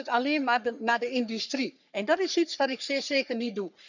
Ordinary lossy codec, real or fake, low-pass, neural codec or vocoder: none; fake; 7.2 kHz; codec, 44.1 kHz, 3.4 kbps, Pupu-Codec